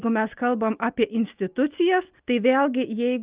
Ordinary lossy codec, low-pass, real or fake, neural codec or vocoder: Opus, 32 kbps; 3.6 kHz; real; none